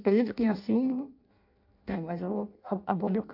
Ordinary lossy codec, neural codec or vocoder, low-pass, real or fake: AAC, 48 kbps; codec, 16 kHz in and 24 kHz out, 0.6 kbps, FireRedTTS-2 codec; 5.4 kHz; fake